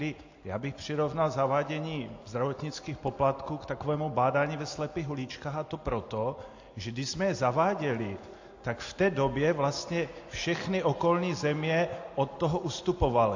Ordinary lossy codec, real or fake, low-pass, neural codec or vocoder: AAC, 48 kbps; real; 7.2 kHz; none